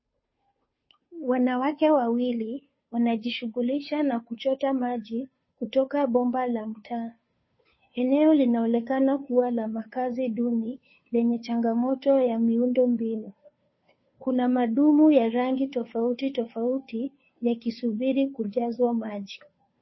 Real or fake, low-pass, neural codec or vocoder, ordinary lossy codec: fake; 7.2 kHz; codec, 16 kHz, 2 kbps, FunCodec, trained on Chinese and English, 25 frames a second; MP3, 24 kbps